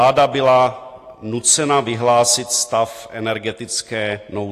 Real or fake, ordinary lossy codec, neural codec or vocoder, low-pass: real; AAC, 48 kbps; none; 14.4 kHz